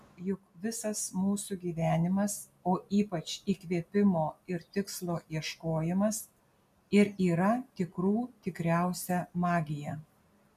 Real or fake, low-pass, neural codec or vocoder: real; 14.4 kHz; none